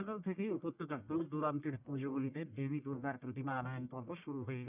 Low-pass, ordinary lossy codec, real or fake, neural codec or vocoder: 3.6 kHz; none; fake; codec, 44.1 kHz, 1.7 kbps, Pupu-Codec